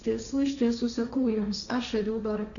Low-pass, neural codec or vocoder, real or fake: 7.2 kHz; codec, 16 kHz, 1.1 kbps, Voila-Tokenizer; fake